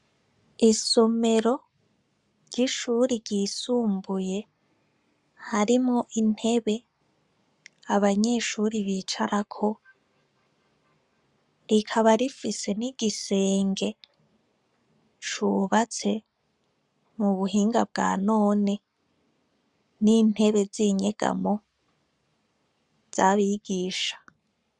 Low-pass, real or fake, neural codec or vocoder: 10.8 kHz; fake; codec, 44.1 kHz, 7.8 kbps, Pupu-Codec